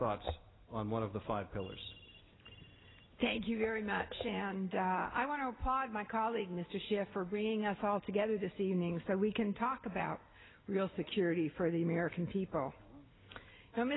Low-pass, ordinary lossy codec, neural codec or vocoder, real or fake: 7.2 kHz; AAC, 16 kbps; none; real